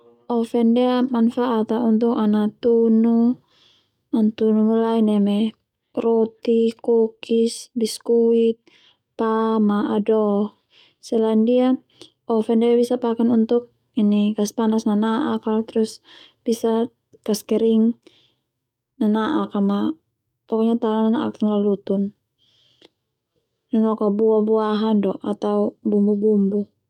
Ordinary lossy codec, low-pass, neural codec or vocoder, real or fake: none; 19.8 kHz; codec, 44.1 kHz, 7.8 kbps, DAC; fake